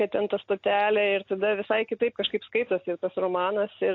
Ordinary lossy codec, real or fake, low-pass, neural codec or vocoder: AAC, 48 kbps; real; 7.2 kHz; none